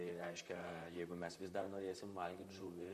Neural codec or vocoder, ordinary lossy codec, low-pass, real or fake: vocoder, 44.1 kHz, 128 mel bands, Pupu-Vocoder; MP3, 64 kbps; 14.4 kHz; fake